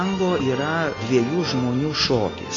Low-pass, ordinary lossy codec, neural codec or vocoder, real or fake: 7.2 kHz; AAC, 32 kbps; none; real